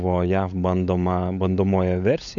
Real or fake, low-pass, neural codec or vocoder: fake; 7.2 kHz; codec, 16 kHz, 16 kbps, FunCodec, trained on LibriTTS, 50 frames a second